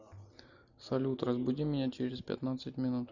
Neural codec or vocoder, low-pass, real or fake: none; 7.2 kHz; real